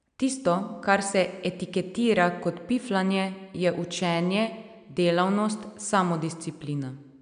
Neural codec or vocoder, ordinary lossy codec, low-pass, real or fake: none; none; 9.9 kHz; real